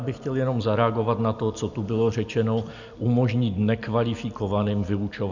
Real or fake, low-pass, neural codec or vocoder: real; 7.2 kHz; none